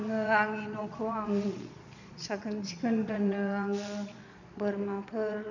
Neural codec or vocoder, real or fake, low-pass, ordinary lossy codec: vocoder, 22.05 kHz, 80 mel bands, Vocos; fake; 7.2 kHz; none